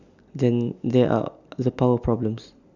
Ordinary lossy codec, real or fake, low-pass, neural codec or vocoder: none; real; 7.2 kHz; none